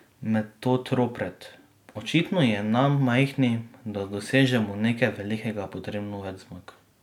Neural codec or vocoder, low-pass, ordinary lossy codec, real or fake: none; 19.8 kHz; none; real